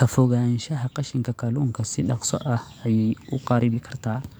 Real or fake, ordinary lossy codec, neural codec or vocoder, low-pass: fake; none; codec, 44.1 kHz, 7.8 kbps, DAC; none